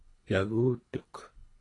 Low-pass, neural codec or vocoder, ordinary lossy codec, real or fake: 10.8 kHz; codec, 24 kHz, 3 kbps, HILCodec; AAC, 32 kbps; fake